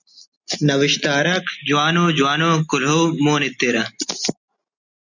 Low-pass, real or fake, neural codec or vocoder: 7.2 kHz; real; none